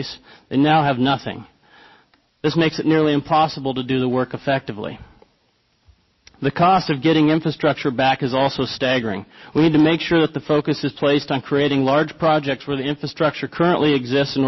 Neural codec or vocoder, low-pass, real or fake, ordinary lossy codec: none; 7.2 kHz; real; MP3, 24 kbps